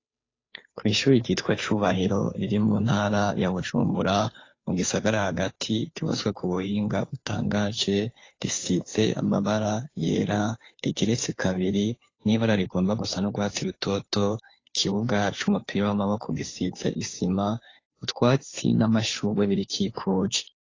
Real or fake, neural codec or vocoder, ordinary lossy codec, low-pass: fake; codec, 16 kHz, 2 kbps, FunCodec, trained on Chinese and English, 25 frames a second; AAC, 32 kbps; 7.2 kHz